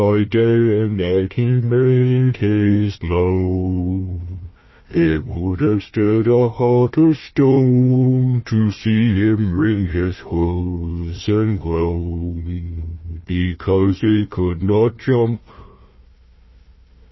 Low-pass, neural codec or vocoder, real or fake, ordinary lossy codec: 7.2 kHz; codec, 16 kHz, 1 kbps, FunCodec, trained on Chinese and English, 50 frames a second; fake; MP3, 24 kbps